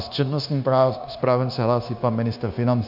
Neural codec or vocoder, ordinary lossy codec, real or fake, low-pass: codec, 16 kHz, 0.9 kbps, LongCat-Audio-Codec; AAC, 48 kbps; fake; 5.4 kHz